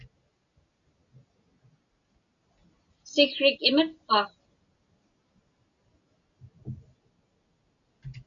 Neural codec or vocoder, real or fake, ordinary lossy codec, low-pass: none; real; MP3, 96 kbps; 7.2 kHz